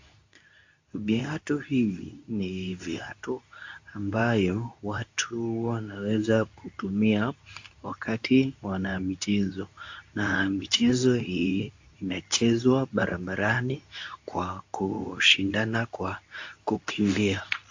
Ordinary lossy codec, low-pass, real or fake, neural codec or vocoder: AAC, 48 kbps; 7.2 kHz; fake; codec, 24 kHz, 0.9 kbps, WavTokenizer, medium speech release version 2